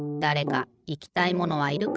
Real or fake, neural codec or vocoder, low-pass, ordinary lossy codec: fake; codec, 16 kHz, 16 kbps, FreqCodec, larger model; none; none